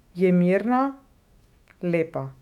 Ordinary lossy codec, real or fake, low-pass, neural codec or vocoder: none; fake; 19.8 kHz; autoencoder, 48 kHz, 128 numbers a frame, DAC-VAE, trained on Japanese speech